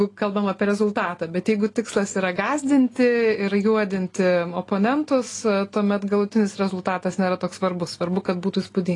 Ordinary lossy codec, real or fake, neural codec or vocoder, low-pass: AAC, 32 kbps; real; none; 10.8 kHz